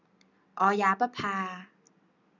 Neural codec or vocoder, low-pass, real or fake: none; 7.2 kHz; real